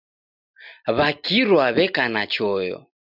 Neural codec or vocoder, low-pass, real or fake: none; 5.4 kHz; real